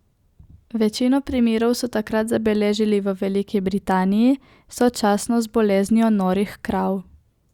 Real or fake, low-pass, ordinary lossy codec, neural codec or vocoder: real; 19.8 kHz; Opus, 64 kbps; none